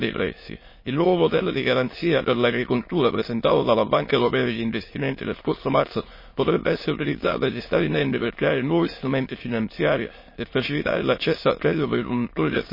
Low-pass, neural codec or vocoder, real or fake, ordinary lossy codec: 5.4 kHz; autoencoder, 22.05 kHz, a latent of 192 numbers a frame, VITS, trained on many speakers; fake; MP3, 24 kbps